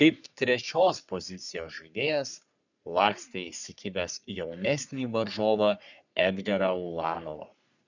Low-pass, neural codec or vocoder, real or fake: 7.2 kHz; codec, 44.1 kHz, 3.4 kbps, Pupu-Codec; fake